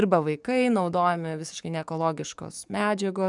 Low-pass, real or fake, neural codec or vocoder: 10.8 kHz; fake; codec, 44.1 kHz, 7.8 kbps, DAC